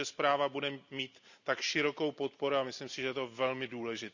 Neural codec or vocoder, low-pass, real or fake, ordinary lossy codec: none; 7.2 kHz; real; none